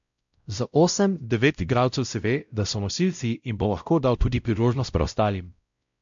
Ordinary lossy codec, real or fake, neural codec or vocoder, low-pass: MP3, 64 kbps; fake; codec, 16 kHz, 0.5 kbps, X-Codec, WavLM features, trained on Multilingual LibriSpeech; 7.2 kHz